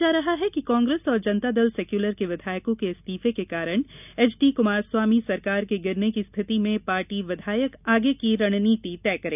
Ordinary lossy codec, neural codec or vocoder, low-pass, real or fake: none; none; 3.6 kHz; real